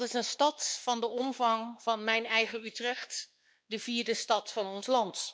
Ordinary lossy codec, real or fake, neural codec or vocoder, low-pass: none; fake; codec, 16 kHz, 2 kbps, X-Codec, WavLM features, trained on Multilingual LibriSpeech; none